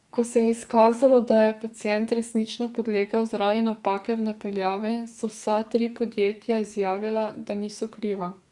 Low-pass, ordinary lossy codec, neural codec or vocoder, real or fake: 10.8 kHz; Opus, 64 kbps; codec, 44.1 kHz, 2.6 kbps, SNAC; fake